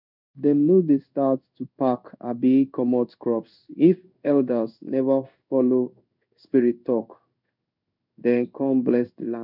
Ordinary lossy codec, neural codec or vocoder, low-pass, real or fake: none; codec, 16 kHz in and 24 kHz out, 1 kbps, XY-Tokenizer; 5.4 kHz; fake